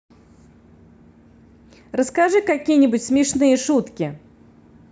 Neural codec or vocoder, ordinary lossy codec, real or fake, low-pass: none; none; real; none